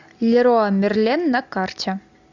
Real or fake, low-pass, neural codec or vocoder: real; 7.2 kHz; none